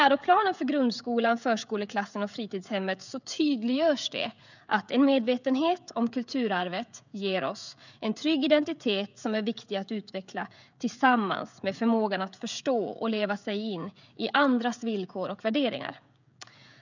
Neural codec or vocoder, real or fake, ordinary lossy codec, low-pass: codec, 16 kHz, 16 kbps, FreqCodec, smaller model; fake; none; 7.2 kHz